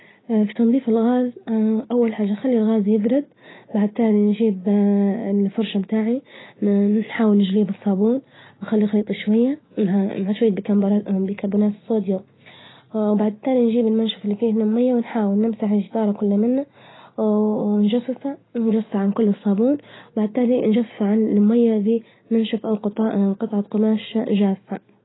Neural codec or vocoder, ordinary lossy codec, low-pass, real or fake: none; AAC, 16 kbps; 7.2 kHz; real